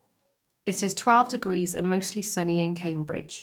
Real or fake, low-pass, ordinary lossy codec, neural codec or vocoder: fake; 19.8 kHz; none; codec, 44.1 kHz, 2.6 kbps, DAC